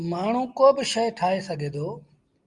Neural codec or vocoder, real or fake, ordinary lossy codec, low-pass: none; real; Opus, 32 kbps; 10.8 kHz